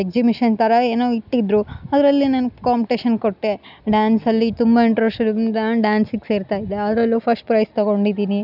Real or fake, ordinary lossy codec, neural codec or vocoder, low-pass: real; none; none; 5.4 kHz